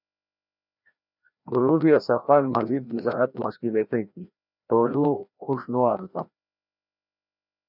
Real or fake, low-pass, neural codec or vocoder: fake; 5.4 kHz; codec, 16 kHz, 1 kbps, FreqCodec, larger model